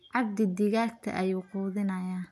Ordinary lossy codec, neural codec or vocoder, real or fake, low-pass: none; none; real; none